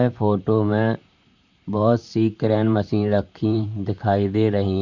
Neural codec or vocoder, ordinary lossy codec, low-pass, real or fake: vocoder, 44.1 kHz, 128 mel bands, Pupu-Vocoder; none; 7.2 kHz; fake